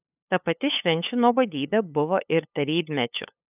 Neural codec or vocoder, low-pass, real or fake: codec, 16 kHz, 8 kbps, FunCodec, trained on LibriTTS, 25 frames a second; 3.6 kHz; fake